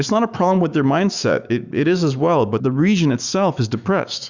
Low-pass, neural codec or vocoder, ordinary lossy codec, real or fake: 7.2 kHz; autoencoder, 48 kHz, 128 numbers a frame, DAC-VAE, trained on Japanese speech; Opus, 64 kbps; fake